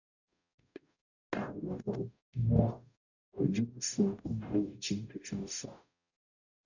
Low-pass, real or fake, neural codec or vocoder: 7.2 kHz; fake; codec, 44.1 kHz, 0.9 kbps, DAC